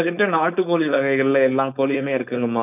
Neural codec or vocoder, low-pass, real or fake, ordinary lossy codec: codec, 16 kHz, 4.8 kbps, FACodec; 3.6 kHz; fake; none